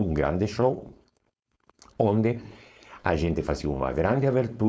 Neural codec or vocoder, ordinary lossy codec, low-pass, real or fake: codec, 16 kHz, 4.8 kbps, FACodec; none; none; fake